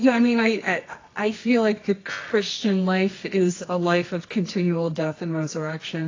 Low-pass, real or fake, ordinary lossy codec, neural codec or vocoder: 7.2 kHz; fake; AAC, 32 kbps; codec, 24 kHz, 0.9 kbps, WavTokenizer, medium music audio release